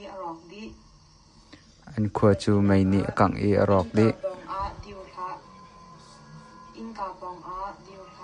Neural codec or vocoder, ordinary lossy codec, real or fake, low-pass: none; MP3, 64 kbps; real; 9.9 kHz